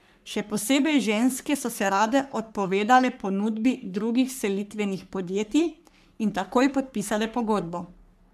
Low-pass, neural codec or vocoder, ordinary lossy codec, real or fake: 14.4 kHz; codec, 44.1 kHz, 3.4 kbps, Pupu-Codec; none; fake